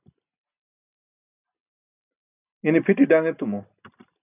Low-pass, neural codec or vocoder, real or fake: 3.6 kHz; none; real